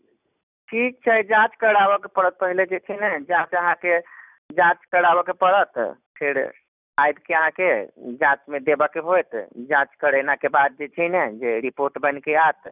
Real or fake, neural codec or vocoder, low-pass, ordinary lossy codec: real; none; 3.6 kHz; none